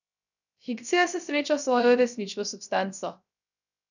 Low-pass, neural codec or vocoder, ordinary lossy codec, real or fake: 7.2 kHz; codec, 16 kHz, 0.3 kbps, FocalCodec; none; fake